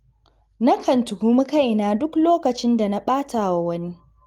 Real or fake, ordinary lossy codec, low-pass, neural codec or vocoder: real; Opus, 24 kbps; 19.8 kHz; none